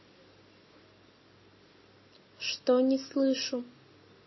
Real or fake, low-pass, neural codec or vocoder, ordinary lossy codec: real; 7.2 kHz; none; MP3, 24 kbps